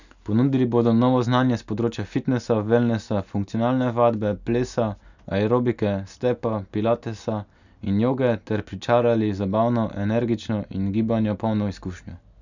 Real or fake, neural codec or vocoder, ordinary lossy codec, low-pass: real; none; none; 7.2 kHz